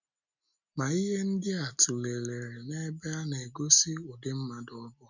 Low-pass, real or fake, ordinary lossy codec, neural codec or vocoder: 7.2 kHz; real; none; none